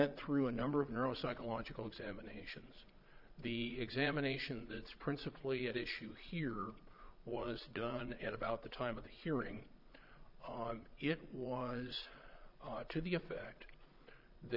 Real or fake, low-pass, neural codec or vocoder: fake; 5.4 kHz; vocoder, 22.05 kHz, 80 mel bands, Vocos